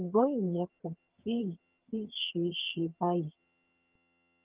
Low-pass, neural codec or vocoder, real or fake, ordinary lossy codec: 3.6 kHz; vocoder, 22.05 kHz, 80 mel bands, HiFi-GAN; fake; Opus, 24 kbps